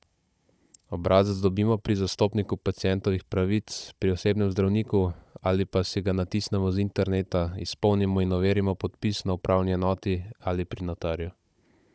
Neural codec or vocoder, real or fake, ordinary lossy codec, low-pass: codec, 16 kHz, 16 kbps, FunCodec, trained on Chinese and English, 50 frames a second; fake; none; none